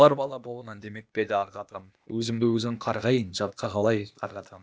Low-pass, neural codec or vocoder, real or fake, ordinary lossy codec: none; codec, 16 kHz, 0.8 kbps, ZipCodec; fake; none